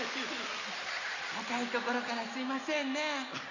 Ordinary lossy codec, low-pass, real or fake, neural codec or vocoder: none; 7.2 kHz; real; none